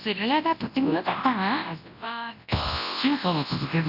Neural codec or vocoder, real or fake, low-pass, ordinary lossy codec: codec, 24 kHz, 0.9 kbps, WavTokenizer, large speech release; fake; 5.4 kHz; none